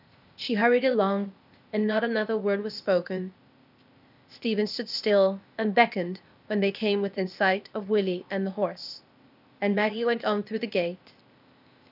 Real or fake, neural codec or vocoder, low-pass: fake; codec, 16 kHz, 0.8 kbps, ZipCodec; 5.4 kHz